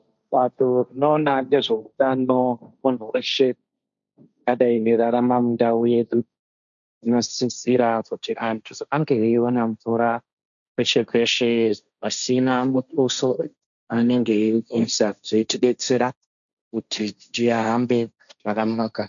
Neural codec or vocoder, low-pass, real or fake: codec, 16 kHz, 1.1 kbps, Voila-Tokenizer; 7.2 kHz; fake